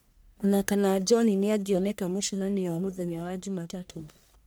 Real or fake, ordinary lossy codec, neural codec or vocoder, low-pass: fake; none; codec, 44.1 kHz, 1.7 kbps, Pupu-Codec; none